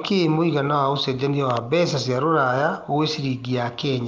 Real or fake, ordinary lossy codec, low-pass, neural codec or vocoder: real; Opus, 32 kbps; 7.2 kHz; none